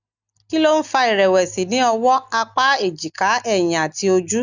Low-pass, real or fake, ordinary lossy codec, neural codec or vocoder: 7.2 kHz; real; none; none